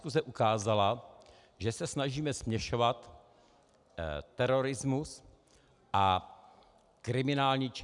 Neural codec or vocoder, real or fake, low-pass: none; real; 10.8 kHz